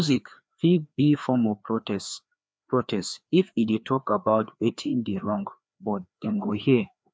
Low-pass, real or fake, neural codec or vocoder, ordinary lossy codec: none; fake; codec, 16 kHz, 2 kbps, FreqCodec, larger model; none